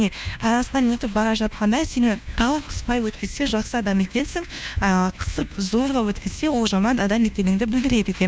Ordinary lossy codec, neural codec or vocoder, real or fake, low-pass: none; codec, 16 kHz, 1 kbps, FunCodec, trained on LibriTTS, 50 frames a second; fake; none